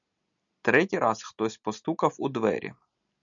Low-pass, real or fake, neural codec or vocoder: 7.2 kHz; real; none